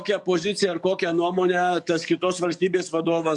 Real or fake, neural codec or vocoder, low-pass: fake; codec, 44.1 kHz, 7.8 kbps, DAC; 10.8 kHz